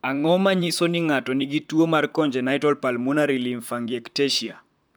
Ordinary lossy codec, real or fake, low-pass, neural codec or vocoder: none; fake; none; vocoder, 44.1 kHz, 128 mel bands, Pupu-Vocoder